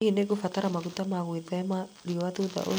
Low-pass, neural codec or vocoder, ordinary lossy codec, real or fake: none; none; none; real